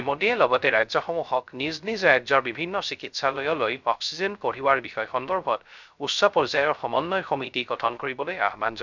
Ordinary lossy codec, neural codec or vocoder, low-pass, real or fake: none; codec, 16 kHz, 0.3 kbps, FocalCodec; 7.2 kHz; fake